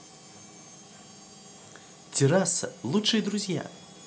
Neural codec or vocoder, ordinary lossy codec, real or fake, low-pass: none; none; real; none